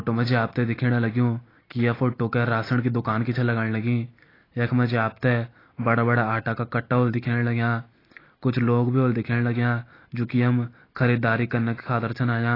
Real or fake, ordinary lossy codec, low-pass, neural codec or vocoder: real; AAC, 24 kbps; 5.4 kHz; none